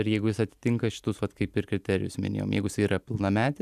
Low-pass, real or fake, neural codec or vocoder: 14.4 kHz; fake; vocoder, 44.1 kHz, 128 mel bands every 256 samples, BigVGAN v2